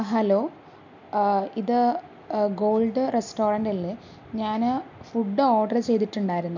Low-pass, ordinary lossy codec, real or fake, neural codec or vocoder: 7.2 kHz; none; real; none